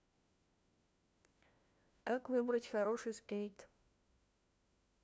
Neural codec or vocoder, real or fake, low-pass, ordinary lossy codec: codec, 16 kHz, 1 kbps, FunCodec, trained on LibriTTS, 50 frames a second; fake; none; none